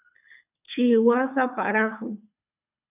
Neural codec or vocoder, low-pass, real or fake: codec, 24 kHz, 6 kbps, HILCodec; 3.6 kHz; fake